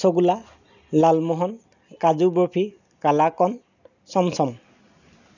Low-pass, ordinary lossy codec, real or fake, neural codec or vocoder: 7.2 kHz; none; real; none